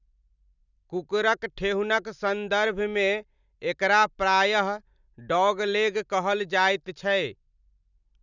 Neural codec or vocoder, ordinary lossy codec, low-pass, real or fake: none; none; 7.2 kHz; real